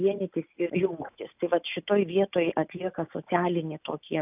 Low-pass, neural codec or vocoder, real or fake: 3.6 kHz; none; real